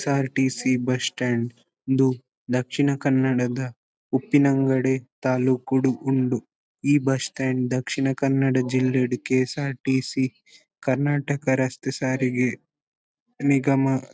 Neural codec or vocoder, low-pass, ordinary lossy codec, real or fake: none; none; none; real